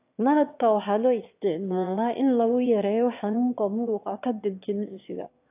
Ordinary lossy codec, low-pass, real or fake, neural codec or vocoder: AAC, 32 kbps; 3.6 kHz; fake; autoencoder, 22.05 kHz, a latent of 192 numbers a frame, VITS, trained on one speaker